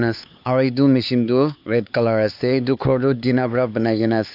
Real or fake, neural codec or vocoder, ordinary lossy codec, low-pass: fake; codec, 16 kHz, 4 kbps, X-Codec, HuBERT features, trained on LibriSpeech; AAC, 48 kbps; 5.4 kHz